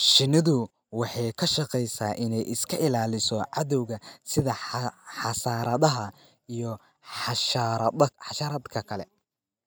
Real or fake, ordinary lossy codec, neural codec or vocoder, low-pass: real; none; none; none